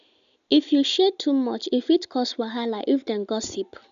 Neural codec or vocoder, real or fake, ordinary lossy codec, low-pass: none; real; none; 7.2 kHz